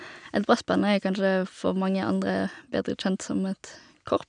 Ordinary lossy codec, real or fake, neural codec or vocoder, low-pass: none; real; none; 9.9 kHz